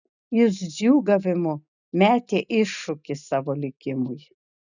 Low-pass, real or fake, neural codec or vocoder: 7.2 kHz; real; none